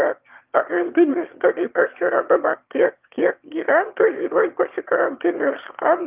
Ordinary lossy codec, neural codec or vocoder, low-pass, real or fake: Opus, 24 kbps; autoencoder, 22.05 kHz, a latent of 192 numbers a frame, VITS, trained on one speaker; 3.6 kHz; fake